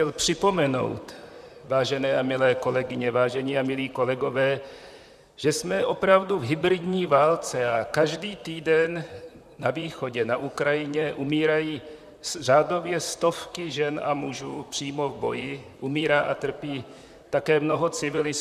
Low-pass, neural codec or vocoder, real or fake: 14.4 kHz; vocoder, 44.1 kHz, 128 mel bands, Pupu-Vocoder; fake